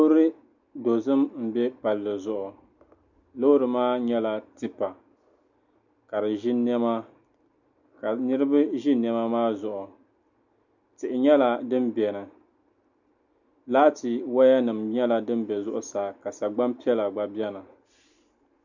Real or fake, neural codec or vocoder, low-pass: real; none; 7.2 kHz